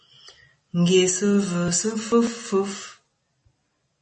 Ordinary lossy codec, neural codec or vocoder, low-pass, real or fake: MP3, 32 kbps; none; 10.8 kHz; real